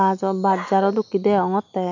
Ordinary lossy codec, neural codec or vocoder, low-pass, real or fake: none; none; 7.2 kHz; real